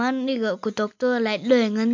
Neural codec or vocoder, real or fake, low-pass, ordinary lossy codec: none; real; 7.2 kHz; MP3, 48 kbps